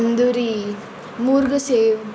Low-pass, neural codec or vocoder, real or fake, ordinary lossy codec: none; none; real; none